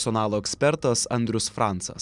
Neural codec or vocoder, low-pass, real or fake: none; 10.8 kHz; real